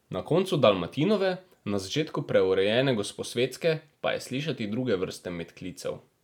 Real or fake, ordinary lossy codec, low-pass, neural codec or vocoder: real; none; 19.8 kHz; none